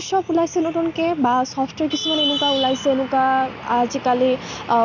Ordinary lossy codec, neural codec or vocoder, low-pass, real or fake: none; none; 7.2 kHz; real